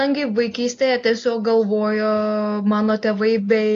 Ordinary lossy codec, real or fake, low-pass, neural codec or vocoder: AAC, 48 kbps; real; 7.2 kHz; none